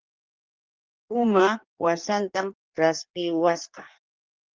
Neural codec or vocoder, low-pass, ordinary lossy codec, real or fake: codec, 16 kHz in and 24 kHz out, 1.1 kbps, FireRedTTS-2 codec; 7.2 kHz; Opus, 24 kbps; fake